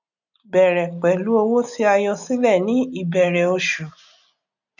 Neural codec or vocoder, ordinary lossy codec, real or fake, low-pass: none; none; real; 7.2 kHz